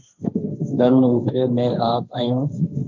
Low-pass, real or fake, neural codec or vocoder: 7.2 kHz; fake; codec, 16 kHz, 1.1 kbps, Voila-Tokenizer